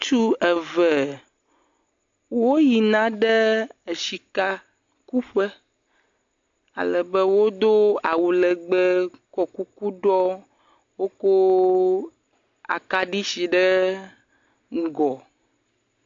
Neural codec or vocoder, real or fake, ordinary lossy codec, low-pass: none; real; AAC, 48 kbps; 7.2 kHz